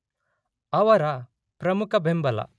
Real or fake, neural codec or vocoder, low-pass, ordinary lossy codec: real; none; none; none